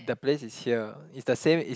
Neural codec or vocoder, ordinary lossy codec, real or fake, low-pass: none; none; real; none